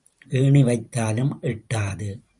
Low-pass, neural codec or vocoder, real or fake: 10.8 kHz; vocoder, 44.1 kHz, 128 mel bands every 512 samples, BigVGAN v2; fake